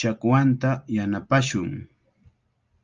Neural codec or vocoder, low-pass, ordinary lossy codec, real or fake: none; 7.2 kHz; Opus, 32 kbps; real